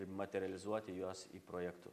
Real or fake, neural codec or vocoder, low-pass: real; none; 14.4 kHz